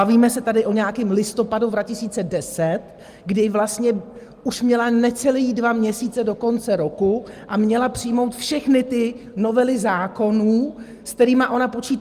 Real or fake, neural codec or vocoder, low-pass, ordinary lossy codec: real; none; 14.4 kHz; Opus, 32 kbps